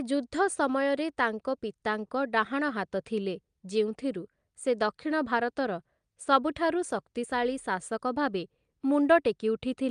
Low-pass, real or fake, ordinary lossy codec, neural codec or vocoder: 9.9 kHz; real; Opus, 32 kbps; none